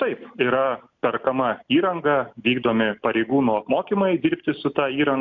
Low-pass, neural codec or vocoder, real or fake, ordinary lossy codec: 7.2 kHz; none; real; MP3, 64 kbps